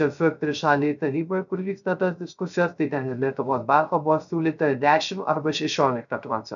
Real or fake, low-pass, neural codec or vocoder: fake; 7.2 kHz; codec, 16 kHz, 0.3 kbps, FocalCodec